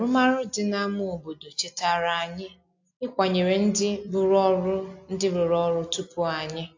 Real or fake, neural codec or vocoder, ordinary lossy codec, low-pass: real; none; AAC, 48 kbps; 7.2 kHz